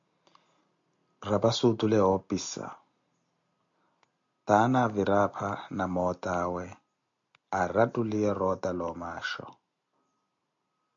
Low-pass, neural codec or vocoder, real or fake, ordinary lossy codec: 7.2 kHz; none; real; AAC, 64 kbps